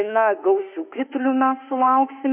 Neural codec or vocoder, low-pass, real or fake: autoencoder, 48 kHz, 32 numbers a frame, DAC-VAE, trained on Japanese speech; 3.6 kHz; fake